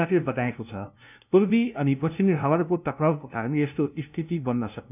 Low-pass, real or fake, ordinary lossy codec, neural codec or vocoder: 3.6 kHz; fake; none; codec, 16 kHz, 0.5 kbps, FunCodec, trained on LibriTTS, 25 frames a second